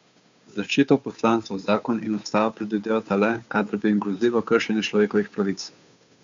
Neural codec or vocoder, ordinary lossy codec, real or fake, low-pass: codec, 16 kHz, 2 kbps, FunCodec, trained on Chinese and English, 25 frames a second; MP3, 64 kbps; fake; 7.2 kHz